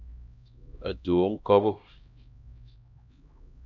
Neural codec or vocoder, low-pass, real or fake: codec, 16 kHz, 1 kbps, X-Codec, HuBERT features, trained on LibriSpeech; 7.2 kHz; fake